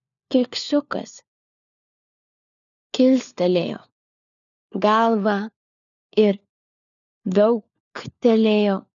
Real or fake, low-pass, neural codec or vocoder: fake; 7.2 kHz; codec, 16 kHz, 4 kbps, FunCodec, trained on LibriTTS, 50 frames a second